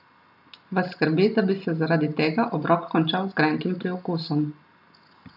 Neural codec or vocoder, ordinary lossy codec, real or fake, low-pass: none; none; real; 5.4 kHz